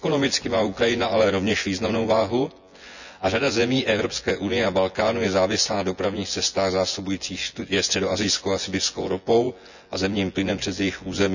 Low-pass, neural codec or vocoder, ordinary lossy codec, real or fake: 7.2 kHz; vocoder, 24 kHz, 100 mel bands, Vocos; none; fake